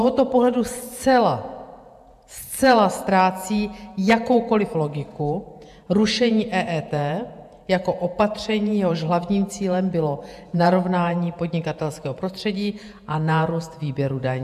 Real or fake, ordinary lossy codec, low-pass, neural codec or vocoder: fake; AAC, 96 kbps; 14.4 kHz; vocoder, 48 kHz, 128 mel bands, Vocos